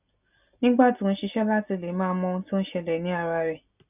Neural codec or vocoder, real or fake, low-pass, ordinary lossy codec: none; real; 3.6 kHz; none